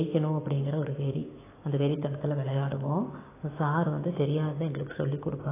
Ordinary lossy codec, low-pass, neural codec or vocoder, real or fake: AAC, 16 kbps; 3.6 kHz; codec, 16 kHz, 6 kbps, DAC; fake